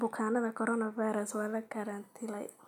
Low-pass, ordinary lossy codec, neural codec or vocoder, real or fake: 19.8 kHz; none; none; real